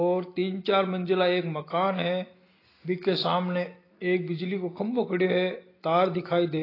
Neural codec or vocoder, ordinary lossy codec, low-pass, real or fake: none; AAC, 24 kbps; 5.4 kHz; real